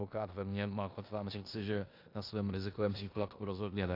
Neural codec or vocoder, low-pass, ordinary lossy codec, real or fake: codec, 16 kHz in and 24 kHz out, 0.9 kbps, LongCat-Audio-Codec, four codebook decoder; 5.4 kHz; AAC, 32 kbps; fake